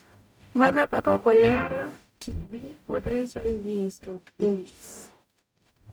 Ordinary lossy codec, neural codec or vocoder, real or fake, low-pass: none; codec, 44.1 kHz, 0.9 kbps, DAC; fake; none